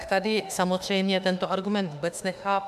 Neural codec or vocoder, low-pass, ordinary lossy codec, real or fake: autoencoder, 48 kHz, 32 numbers a frame, DAC-VAE, trained on Japanese speech; 14.4 kHz; MP3, 96 kbps; fake